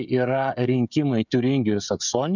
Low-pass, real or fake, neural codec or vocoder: 7.2 kHz; fake; codec, 16 kHz, 16 kbps, FreqCodec, smaller model